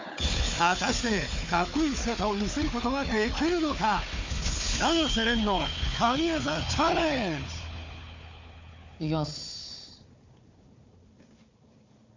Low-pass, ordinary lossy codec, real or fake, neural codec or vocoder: 7.2 kHz; MP3, 64 kbps; fake; codec, 16 kHz, 4 kbps, FunCodec, trained on Chinese and English, 50 frames a second